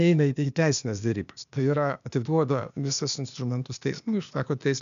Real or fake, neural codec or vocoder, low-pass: fake; codec, 16 kHz, 0.8 kbps, ZipCodec; 7.2 kHz